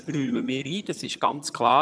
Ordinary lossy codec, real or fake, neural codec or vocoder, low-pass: none; fake; vocoder, 22.05 kHz, 80 mel bands, HiFi-GAN; none